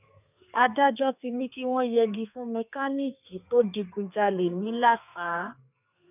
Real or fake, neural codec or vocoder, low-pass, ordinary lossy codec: fake; codec, 32 kHz, 1.9 kbps, SNAC; 3.6 kHz; none